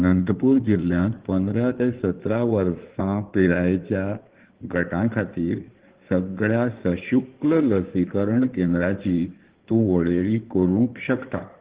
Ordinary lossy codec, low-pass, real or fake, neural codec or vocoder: Opus, 16 kbps; 3.6 kHz; fake; codec, 16 kHz in and 24 kHz out, 2.2 kbps, FireRedTTS-2 codec